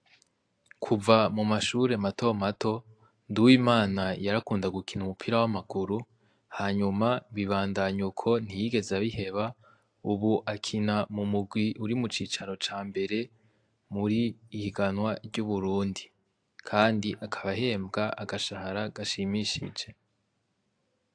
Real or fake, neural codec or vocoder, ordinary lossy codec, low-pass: real; none; AAC, 64 kbps; 9.9 kHz